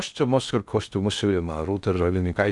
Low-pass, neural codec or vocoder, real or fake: 10.8 kHz; codec, 16 kHz in and 24 kHz out, 0.6 kbps, FocalCodec, streaming, 2048 codes; fake